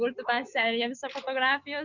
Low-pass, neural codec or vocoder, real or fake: 7.2 kHz; none; real